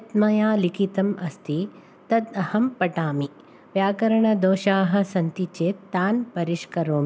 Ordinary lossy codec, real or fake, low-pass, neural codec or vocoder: none; real; none; none